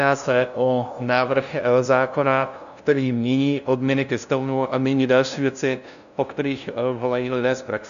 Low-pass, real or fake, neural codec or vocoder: 7.2 kHz; fake; codec, 16 kHz, 0.5 kbps, FunCodec, trained on LibriTTS, 25 frames a second